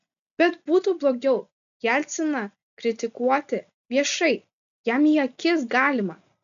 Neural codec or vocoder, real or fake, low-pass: none; real; 7.2 kHz